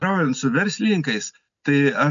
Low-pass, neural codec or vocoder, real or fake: 7.2 kHz; none; real